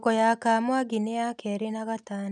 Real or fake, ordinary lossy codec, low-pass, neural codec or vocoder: real; none; 10.8 kHz; none